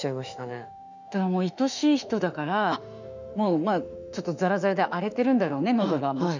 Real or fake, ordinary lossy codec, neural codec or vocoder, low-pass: fake; none; autoencoder, 48 kHz, 32 numbers a frame, DAC-VAE, trained on Japanese speech; 7.2 kHz